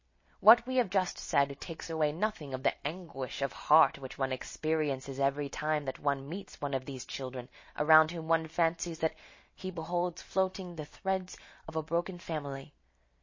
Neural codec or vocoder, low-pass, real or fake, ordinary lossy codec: none; 7.2 kHz; real; MP3, 32 kbps